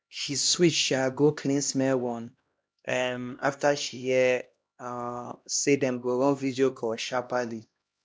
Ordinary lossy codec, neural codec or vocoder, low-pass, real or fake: none; codec, 16 kHz, 1 kbps, X-Codec, HuBERT features, trained on LibriSpeech; none; fake